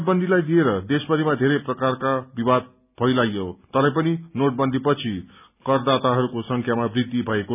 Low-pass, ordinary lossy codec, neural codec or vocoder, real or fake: 3.6 kHz; none; none; real